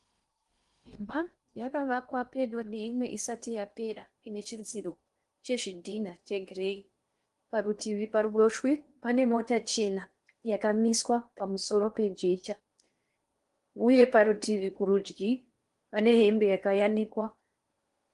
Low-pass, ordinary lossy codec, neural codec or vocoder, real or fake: 10.8 kHz; Opus, 24 kbps; codec, 16 kHz in and 24 kHz out, 0.8 kbps, FocalCodec, streaming, 65536 codes; fake